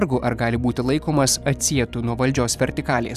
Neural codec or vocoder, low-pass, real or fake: vocoder, 44.1 kHz, 128 mel bands every 256 samples, BigVGAN v2; 14.4 kHz; fake